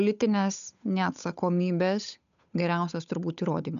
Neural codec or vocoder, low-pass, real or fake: codec, 16 kHz, 8 kbps, FunCodec, trained on Chinese and English, 25 frames a second; 7.2 kHz; fake